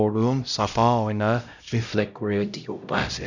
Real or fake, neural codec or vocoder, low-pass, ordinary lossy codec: fake; codec, 16 kHz, 0.5 kbps, X-Codec, HuBERT features, trained on LibriSpeech; 7.2 kHz; none